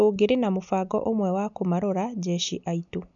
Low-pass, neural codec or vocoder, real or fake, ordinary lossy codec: 7.2 kHz; none; real; none